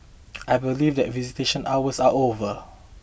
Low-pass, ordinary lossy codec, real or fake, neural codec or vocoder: none; none; real; none